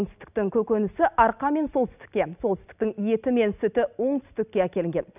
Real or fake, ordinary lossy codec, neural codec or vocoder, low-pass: real; none; none; 3.6 kHz